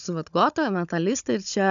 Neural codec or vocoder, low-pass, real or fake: codec, 16 kHz, 16 kbps, FunCodec, trained on Chinese and English, 50 frames a second; 7.2 kHz; fake